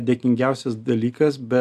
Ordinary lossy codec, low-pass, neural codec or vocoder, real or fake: MP3, 96 kbps; 14.4 kHz; none; real